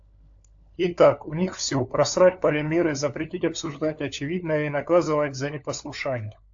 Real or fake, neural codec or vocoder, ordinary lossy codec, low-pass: fake; codec, 16 kHz, 4 kbps, FunCodec, trained on LibriTTS, 50 frames a second; AAC, 64 kbps; 7.2 kHz